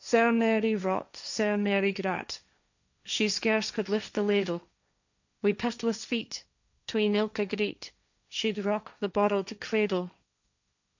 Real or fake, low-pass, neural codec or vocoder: fake; 7.2 kHz; codec, 16 kHz, 1.1 kbps, Voila-Tokenizer